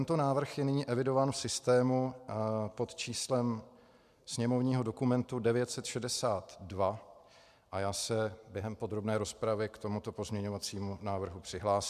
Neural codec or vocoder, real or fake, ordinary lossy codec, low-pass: none; real; MP3, 96 kbps; 14.4 kHz